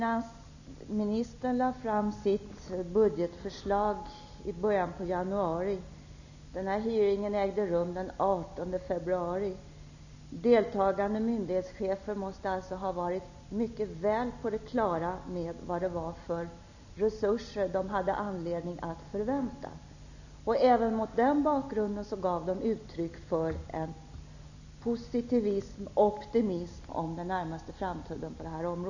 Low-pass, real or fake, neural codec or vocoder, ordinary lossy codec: 7.2 kHz; real; none; none